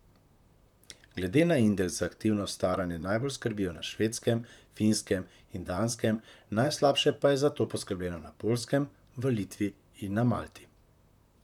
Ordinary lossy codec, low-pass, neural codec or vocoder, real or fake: none; 19.8 kHz; vocoder, 44.1 kHz, 128 mel bands, Pupu-Vocoder; fake